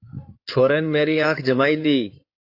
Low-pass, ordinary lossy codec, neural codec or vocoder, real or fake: 5.4 kHz; AAC, 32 kbps; codec, 16 kHz in and 24 kHz out, 2.2 kbps, FireRedTTS-2 codec; fake